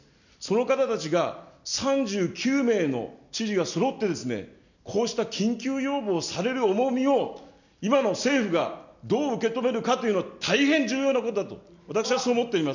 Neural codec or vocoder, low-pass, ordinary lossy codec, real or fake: none; 7.2 kHz; none; real